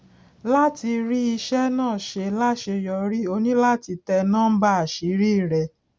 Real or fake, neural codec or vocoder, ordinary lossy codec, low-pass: real; none; none; none